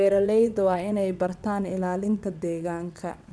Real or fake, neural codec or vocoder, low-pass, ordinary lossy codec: fake; vocoder, 22.05 kHz, 80 mel bands, WaveNeXt; none; none